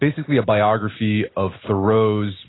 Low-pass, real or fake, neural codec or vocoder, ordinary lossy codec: 7.2 kHz; real; none; AAC, 16 kbps